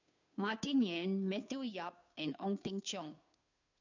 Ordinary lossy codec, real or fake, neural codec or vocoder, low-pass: none; fake; codec, 16 kHz, 2 kbps, FunCodec, trained on Chinese and English, 25 frames a second; 7.2 kHz